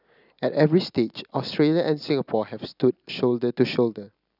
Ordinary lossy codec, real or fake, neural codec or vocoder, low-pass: none; real; none; 5.4 kHz